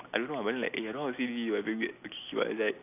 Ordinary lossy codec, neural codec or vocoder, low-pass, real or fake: none; none; 3.6 kHz; real